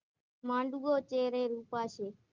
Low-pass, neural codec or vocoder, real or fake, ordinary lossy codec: 7.2 kHz; none; real; Opus, 24 kbps